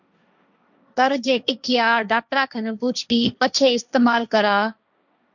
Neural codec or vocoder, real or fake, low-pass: codec, 16 kHz, 1.1 kbps, Voila-Tokenizer; fake; 7.2 kHz